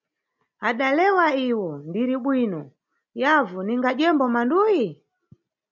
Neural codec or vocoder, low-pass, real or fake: none; 7.2 kHz; real